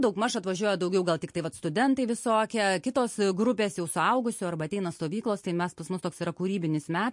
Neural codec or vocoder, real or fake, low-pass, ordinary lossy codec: none; real; 10.8 kHz; MP3, 48 kbps